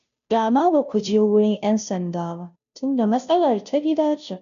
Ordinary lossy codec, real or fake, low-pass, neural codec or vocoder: none; fake; 7.2 kHz; codec, 16 kHz, 0.5 kbps, FunCodec, trained on Chinese and English, 25 frames a second